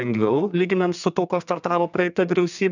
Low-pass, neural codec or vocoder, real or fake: 7.2 kHz; codec, 32 kHz, 1.9 kbps, SNAC; fake